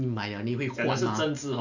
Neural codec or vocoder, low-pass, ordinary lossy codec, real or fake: none; 7.2 kHz; none; real